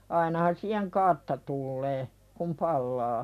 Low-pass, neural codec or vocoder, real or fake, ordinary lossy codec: 14.4 kHz; none; real; none